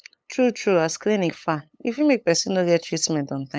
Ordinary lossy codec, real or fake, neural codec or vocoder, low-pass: none; fake; codec, 16 kHz, 8 kbps, FunCodec, trained on LibriTTS, 25 frames a second; none